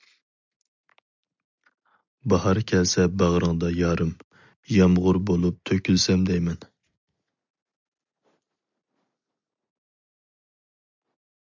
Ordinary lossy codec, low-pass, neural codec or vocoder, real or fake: MP3, 64 kbps; 7.2 kHz; none; real